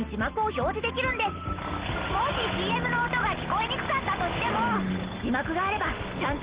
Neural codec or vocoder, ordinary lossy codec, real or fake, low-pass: none; Opus, 16 kbps; real; 3.6 kHz